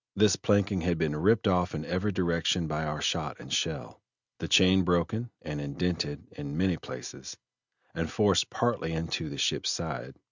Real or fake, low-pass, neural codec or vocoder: real; 7.2 kHz; none